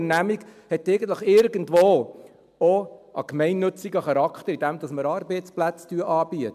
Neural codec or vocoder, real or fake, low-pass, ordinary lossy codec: none; real; 14.4 kHz; MP3, 96 kbps